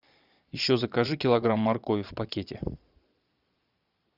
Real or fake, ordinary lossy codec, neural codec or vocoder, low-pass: fake; Opus, 64 kbps; vocoder, 22.05 kHz, 80 mel bands, Vocos; 5.4 kHz